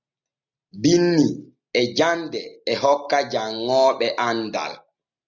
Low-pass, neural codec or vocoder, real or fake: 7.2 kHz; none; real